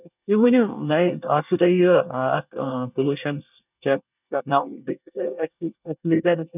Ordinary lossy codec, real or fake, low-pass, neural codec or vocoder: none; fake; 3.6 kHz; codec, 24 kHz, 1 kbps, SNAC